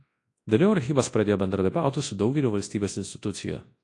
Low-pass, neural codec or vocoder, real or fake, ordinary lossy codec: 10.8 kHz; codec, 24 kHz, 0.9 kbps, WavTokenizer, large speech release; fake; AAC, 48 kbps